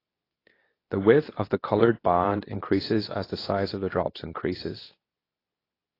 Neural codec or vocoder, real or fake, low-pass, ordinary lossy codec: codec, 24 kHz, 0.9 kbps, WavTokenizer, medium speech release version 2; fake; 5.4 kHz; AAC, 24 kbps